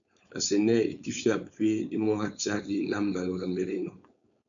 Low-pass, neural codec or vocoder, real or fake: 7.2 kHz; codec, 16 kHz, 4.8 kbps, FACodec; fake